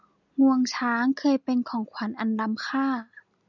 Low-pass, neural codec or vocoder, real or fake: 7.2 kHz; none; real